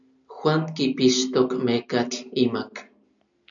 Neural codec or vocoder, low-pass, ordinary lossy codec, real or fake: none; 7.2 kHz; MP3, 96 kbps; real